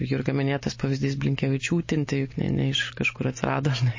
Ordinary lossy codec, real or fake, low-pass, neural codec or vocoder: MP3, 32 kbps; real; 7.2 kHz; none